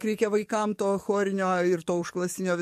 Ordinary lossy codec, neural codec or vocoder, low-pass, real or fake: MP3, 64 kbps; codec, 44.1 kHz, 7.8 kbps, DAC; 14.4 kHz; fake